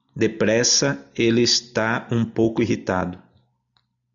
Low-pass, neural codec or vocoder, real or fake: 7.2 kHz; none; real